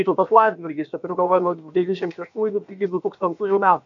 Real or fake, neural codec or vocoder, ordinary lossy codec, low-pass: fake; codec, 16 kHz, about 1 kbps, DyCAST, with the encoder's durations; MP3, 64 kbps; 7.2 kHz